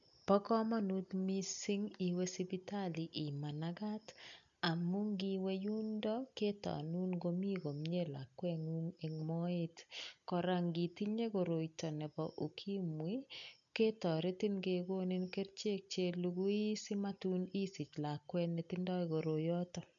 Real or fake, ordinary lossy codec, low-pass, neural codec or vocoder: real; none; 7.2 kHz; none